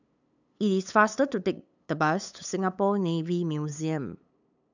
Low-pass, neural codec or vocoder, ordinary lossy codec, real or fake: 7.2 kHz; codec, 16 kHz, 8 kbps, FunCodec, trained on LibriTTS, 25 frames a second; none; fake